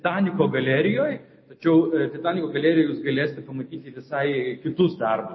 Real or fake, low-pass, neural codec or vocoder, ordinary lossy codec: real; 7.2 kHz; none; MP3, 24 kbps